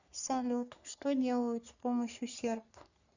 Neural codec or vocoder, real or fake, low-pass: codec, 44.1 kHz, 3.4 kbps, Pupu-Codec; fake; 7.2 kHz